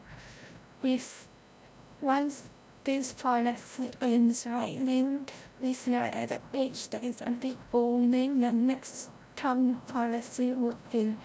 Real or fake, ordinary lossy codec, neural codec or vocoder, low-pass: fake; none; codec, 16 kHz, 0.5 kbps, FreqCodec, larger model; none